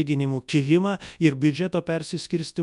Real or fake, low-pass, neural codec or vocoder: fake; 10.8 kHz; codec, 24 kHz, 0.9 kbps, WavTokenizer, large speech release